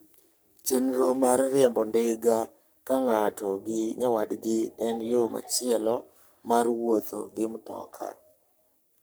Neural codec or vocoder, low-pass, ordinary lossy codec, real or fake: codec, 44.1 kHz, 3.4 kbps, Pupu-Codec; none; none; fake